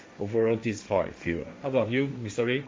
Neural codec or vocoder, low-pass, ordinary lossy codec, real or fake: codec, 16 kHz, 1.1 kbps, Voila-Tokenizer; none; none; fake